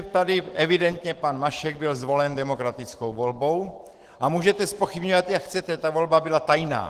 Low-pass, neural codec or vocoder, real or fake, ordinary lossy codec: 14.4 kHz; autoencoder, 48 kHz, 128 numbers a frame, DAC-VAE, trained on Japanese speech; fake; Opus, 16 kbps